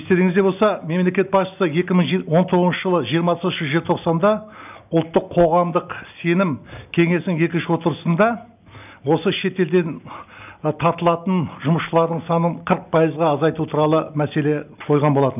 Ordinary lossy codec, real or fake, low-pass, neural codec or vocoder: none; real; 3.6 kHz; none